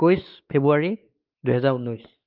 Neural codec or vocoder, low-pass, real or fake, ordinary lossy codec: codec, 16 kHz, 4 kbps, X-Codec, WavLM features, trained on Multilingual LibriSpeech; 5.4 kHz; fake; Opus, 24 kbps